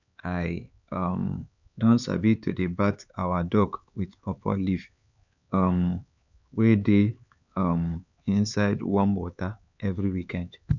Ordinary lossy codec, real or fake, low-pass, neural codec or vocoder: none; fake; 7.2 kHz; codec, 16 kHz, 4 kbps, X-Codec, HuBERT features, trained on LibriSpeech